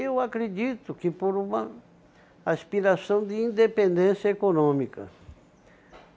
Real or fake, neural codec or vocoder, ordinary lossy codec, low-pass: real; none; none; none